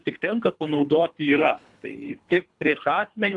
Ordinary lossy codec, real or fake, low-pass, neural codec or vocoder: AAC, 64 kbps; fake; 10.8 kHz; codec, 24 kHz, 3 kbps, HILCodec